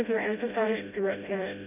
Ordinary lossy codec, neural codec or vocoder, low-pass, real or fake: none; codec, 16 kHz, 0.5 kbps, FreqCodec, smaller model; 3.6 kHz; fake